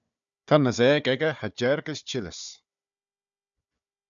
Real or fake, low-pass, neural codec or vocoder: fake; 7.2 kHz; codec, 16 kHz, 4 kbps, FunCodec, trained on Chinese and English, 50 frames a second